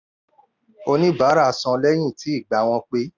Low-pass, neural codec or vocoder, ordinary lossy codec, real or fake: 7.2 kHz; none; none; real